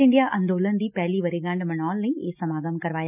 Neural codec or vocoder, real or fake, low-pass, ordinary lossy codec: none; real; 3.6 kHz; none